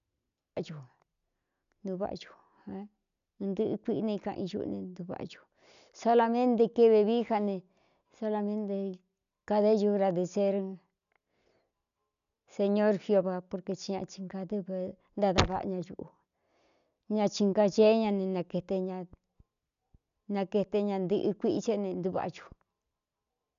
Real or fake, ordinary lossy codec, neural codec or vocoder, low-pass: real; none; none; 7.2 kHz